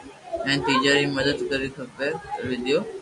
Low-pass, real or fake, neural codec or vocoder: 10.8 kHz; real; none